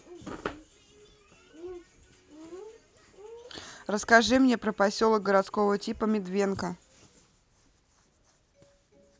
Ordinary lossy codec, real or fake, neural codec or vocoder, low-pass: none; real; none; none